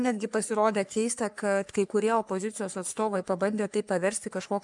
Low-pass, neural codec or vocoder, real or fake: 10.8 kHz; codec, 44.1 kHz, 3.4 kbps, Pupu-Codec; fake